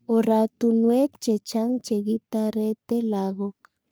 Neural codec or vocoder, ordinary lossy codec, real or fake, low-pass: codec, 44.1 kHz, 3.4 kbps, Pupu-Codec; none; fake; none